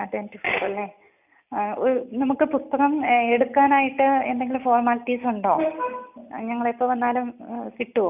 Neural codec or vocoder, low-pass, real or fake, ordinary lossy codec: none; 3.6 kHz; real; none